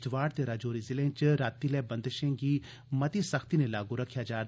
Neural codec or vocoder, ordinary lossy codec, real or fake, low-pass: none; none; real; none